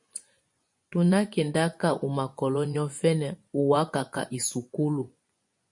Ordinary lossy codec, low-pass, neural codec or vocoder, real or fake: MP3, 48 kbps; 10.8 kHz; vocoder, 24 kHz, 100 mel bands, Vocos; fake